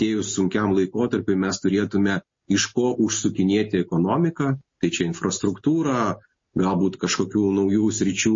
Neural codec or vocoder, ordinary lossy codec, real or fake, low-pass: none; MP3, 32 kbps; real; 7.2 kHz